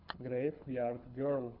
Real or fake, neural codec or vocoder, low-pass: fake; codec, 24 kHz, 6 kbps, HILCodec; 5.4 kHz